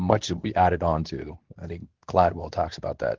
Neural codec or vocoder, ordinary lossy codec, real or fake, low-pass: codec, 24 kHz, 0.9 kbps, WavTokenizer, medium speech release version 2; Opus, 16 kbps; fake; 7.2 kHz